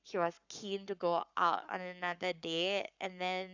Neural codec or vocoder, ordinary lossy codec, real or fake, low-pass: codec, 44.1 kHz, 7.8 kbps, Pupu-Codec; none; fake; 7.2 kHz